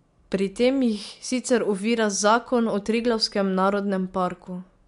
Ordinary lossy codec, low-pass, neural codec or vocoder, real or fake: MP3, 64 kbps; 10.8 kHz; none; real